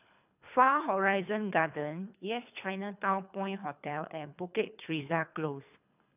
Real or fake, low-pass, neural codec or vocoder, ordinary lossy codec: fake; 3.6 kHz; codec, 24 kHz, 3 kbps, HILCodec; none